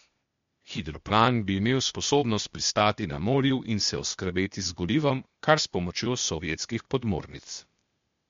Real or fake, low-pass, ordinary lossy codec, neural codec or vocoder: fake; 7.2 kHz; MP3, 48 kbps; codec, 16 kHz, 0.8 kbps, ZipCodec